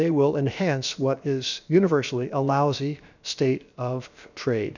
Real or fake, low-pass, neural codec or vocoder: fake; 7.2 kHz; codec, 16 kHz, about 1 kbps, DyCAST, with the encoder's durations